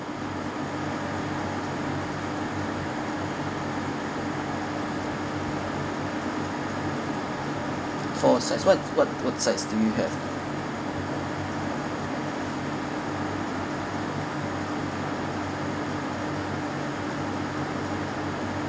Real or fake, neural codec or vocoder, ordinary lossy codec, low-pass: real; none; none; none